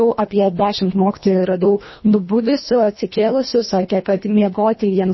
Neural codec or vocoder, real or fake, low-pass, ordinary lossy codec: codec, 24 kHz, 1.5 kbps, HILCodec; fake; 7.2 kHz; MP3, 24 kbps